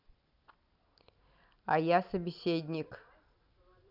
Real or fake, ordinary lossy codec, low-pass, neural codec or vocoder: real; none; 5.4 kHz; none